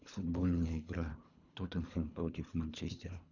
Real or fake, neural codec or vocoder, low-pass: fake; codec, 24 kHz, 3 kbps, HILCodec; 7.2 kHz